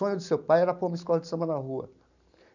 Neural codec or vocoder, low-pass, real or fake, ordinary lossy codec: none; 7.2 kHz; real; none